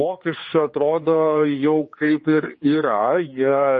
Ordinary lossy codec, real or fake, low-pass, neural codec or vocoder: MP3, 32 kbps; fake; 7.2 kHz; codec, 16 kHz, 4 kbps, X-Codec, HuBERT features, trained on general audio